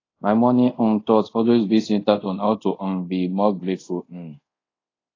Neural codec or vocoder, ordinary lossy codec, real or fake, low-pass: codec, 24 kHz, 0.5 kbps, DualCodec; AAC, 32 kbps; fake; 7.2 kHz